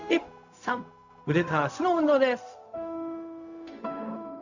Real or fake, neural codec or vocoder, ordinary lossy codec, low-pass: fake; codec, 16 kHz, 0.4 kbps, LongCat-Audio-Codec; none; 7.2 kHz